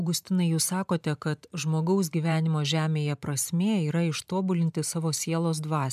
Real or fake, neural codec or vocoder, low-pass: fake; vocoder, 44.1 kHz, 128 mel bands every 512 samples, BigVGAN v2; 14.4 kHz